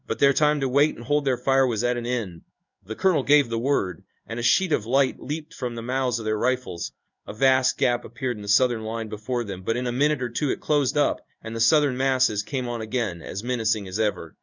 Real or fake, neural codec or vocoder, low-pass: fake; codec, 16 kHz in and 24 kHz out, 1 kbps, XY-Tokenizer; 7.2 kHz